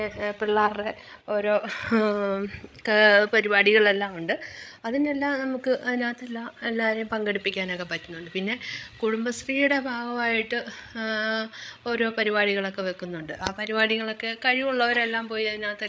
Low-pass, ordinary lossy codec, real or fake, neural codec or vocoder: none; none; fake; codec, 16 kHz, 16 kbps, FreqCodec, larger model